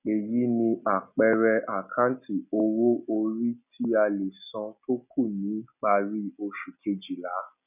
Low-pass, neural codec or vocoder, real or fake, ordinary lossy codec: 3.6 kHz; none; real; none